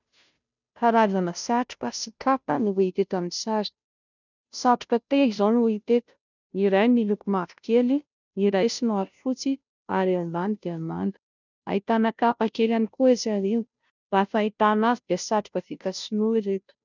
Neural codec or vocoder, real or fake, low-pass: codec, 16 kHz, 0.5 kbps, FunCodec, trained on Chinese and English, 25 frames a second; fake; 7.2 kHz